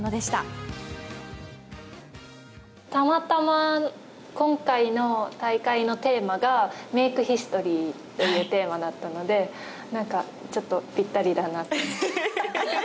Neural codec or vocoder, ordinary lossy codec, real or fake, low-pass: none; none; real; none